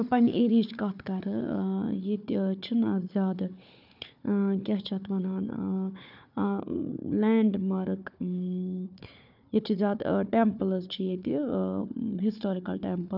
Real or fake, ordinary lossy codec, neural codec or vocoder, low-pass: fake; none; codec, 16 kHz, 4 kbps, FunCodec, trained on Chinese and English, 50 frames a second; 5.4 kHz